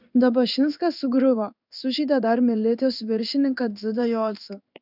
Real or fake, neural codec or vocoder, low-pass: fake; codec, 16 kHz in and 24 kHz out, 1 kbps, XY-Tokenizer; 5.4 kHz